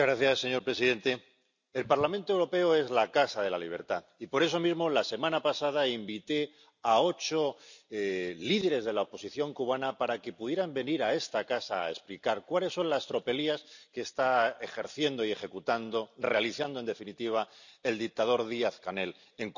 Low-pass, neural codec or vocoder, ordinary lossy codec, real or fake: 7.2 kHz; none; none; real